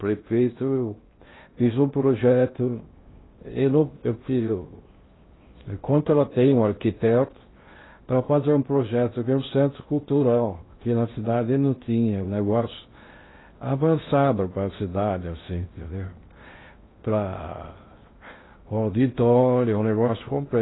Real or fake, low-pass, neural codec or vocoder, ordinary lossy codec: fake; 7.2 kHz; codec, 16 kHz in and 24 kHz out, 0.6 kbps, FocalCodec, streaming, 2048 codes; AAC, 16 kbps